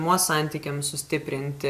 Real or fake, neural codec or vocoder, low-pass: real; none; 14.4 kHz